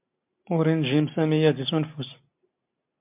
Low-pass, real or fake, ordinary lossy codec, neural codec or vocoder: 3.6 kHz; real; MP3, 32 kbps; none